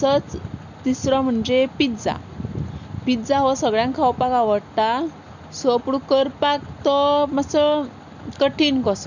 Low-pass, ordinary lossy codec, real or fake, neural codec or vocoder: 7.2 kHz; none; real; none